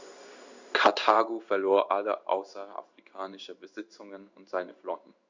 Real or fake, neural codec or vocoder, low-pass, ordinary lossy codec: fake; codec, 16 kHz in and 24 kHz out, 1 kbps, XY-Tokenizer; 7.2 kHz; Opus, 64 kbps